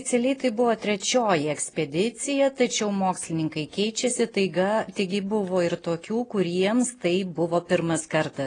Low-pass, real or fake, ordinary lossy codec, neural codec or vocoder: 9.9 kHz; real; AAC, 32 kbps; none